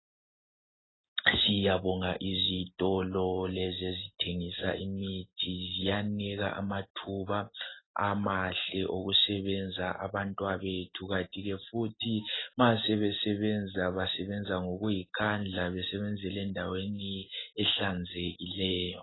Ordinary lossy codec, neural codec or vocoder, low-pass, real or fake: AAC, 16 kbps; none; 7.2 kHz; real